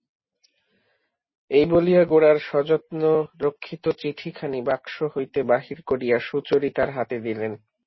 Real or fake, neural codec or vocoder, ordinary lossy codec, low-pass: real; none; MP3, 24 kbps; 7.2 kHz